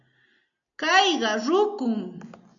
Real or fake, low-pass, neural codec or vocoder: real; 7.2 kHz; none